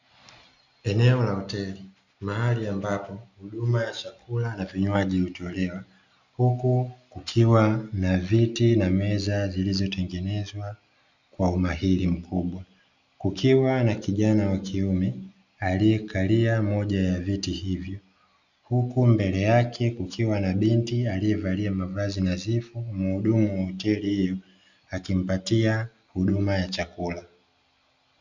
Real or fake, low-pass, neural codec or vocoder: real; 7.2 kHz; none